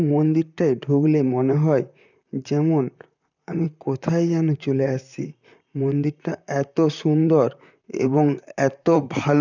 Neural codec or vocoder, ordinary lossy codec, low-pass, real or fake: vocoder, 44.1 kHz, 128 mel bands, Pupu-Vocoder; none; 7.2 kHz; fake